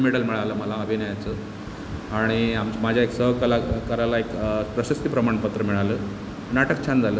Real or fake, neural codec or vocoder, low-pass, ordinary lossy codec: real; none; none; none